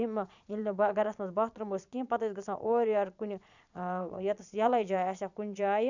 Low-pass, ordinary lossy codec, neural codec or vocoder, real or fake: 7.2 kHz; none; vocoder, 22.05 kHz, 80 mel bands, Vocos; fake